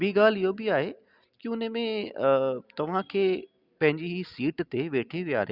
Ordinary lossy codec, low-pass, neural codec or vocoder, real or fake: Opus, 64 kbps; 5.4 kHz; none; real